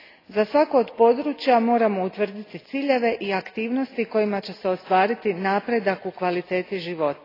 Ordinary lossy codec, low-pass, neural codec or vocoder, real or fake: AAC, 24 kbps; 5.4 kHz; none; real